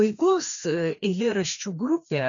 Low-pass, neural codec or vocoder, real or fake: 7.2 kHz; codec, 16 kHz, 2 kbps, FreqCodec, larger model; fake